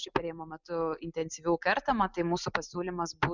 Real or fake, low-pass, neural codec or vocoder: real; 7.2 kHz; none